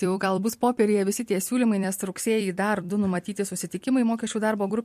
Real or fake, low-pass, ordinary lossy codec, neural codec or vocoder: fake; 14.4 kHz; MP3, 64 kbps; vocoder, 44.1 kHz, 128 mel bands every 256 samples, BigVGAN v2